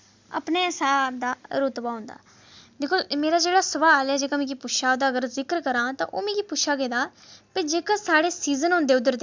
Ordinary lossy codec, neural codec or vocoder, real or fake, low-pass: MP3, 64 kbps; none; real; 7.2 kHz